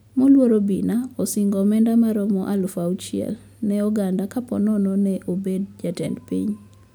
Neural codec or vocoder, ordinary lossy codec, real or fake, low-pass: none; none; real; none